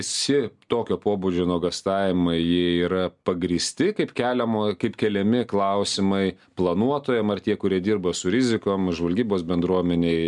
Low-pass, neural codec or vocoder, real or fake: 10.8 kHz; none; real